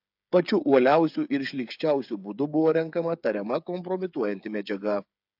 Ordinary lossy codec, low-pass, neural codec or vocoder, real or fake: AAC, 48 kbps; 5.4 kHz; codec, 16 kHz, 8 kbps, FreqCodec, smaller model; fake